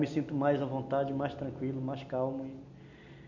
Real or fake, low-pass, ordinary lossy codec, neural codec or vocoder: real; 7.2 kHz; none; none